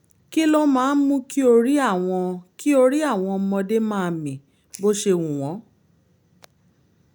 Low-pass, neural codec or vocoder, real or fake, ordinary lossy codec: none; none; real; none